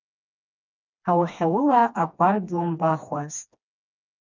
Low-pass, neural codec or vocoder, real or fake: 7.2 kHz; codec, 16 kHz, 2 kbps, FreqCodec, smaller model; fake